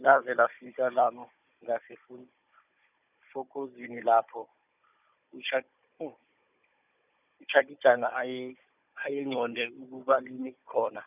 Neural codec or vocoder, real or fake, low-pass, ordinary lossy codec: codec, 16 kHz, 16 kbps, FunCodec, trained on Chinese and English, 50 frames a second; fake; 3.6 kHz; none